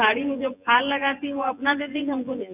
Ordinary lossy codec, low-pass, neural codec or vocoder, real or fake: none; 3.6 kHz; vocoder, 24 kHz, 100 mel bands, Vocos; fake